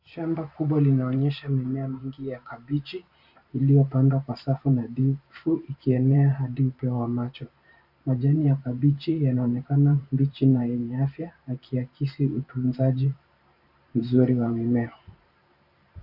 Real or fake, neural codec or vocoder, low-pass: fake; vocoder, 44.1 kHz, 128 mel bands every 512 samples, BigVGAN v2; 5.4 kHz